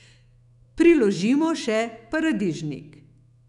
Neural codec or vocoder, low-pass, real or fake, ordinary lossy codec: autoencoder, 48 kHz, 128 numbers a frame, DAC-VAE, trained on Japanese speech; 10.8 kHz; fake; none